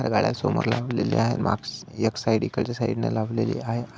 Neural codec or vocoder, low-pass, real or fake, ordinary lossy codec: none; none; real; none